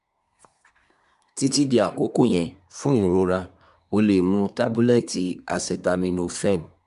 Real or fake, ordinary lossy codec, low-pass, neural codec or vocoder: fake; MP3, 96 kbps; 10.8 kHz; codec, 24 kHz, 1 kbps, SNAC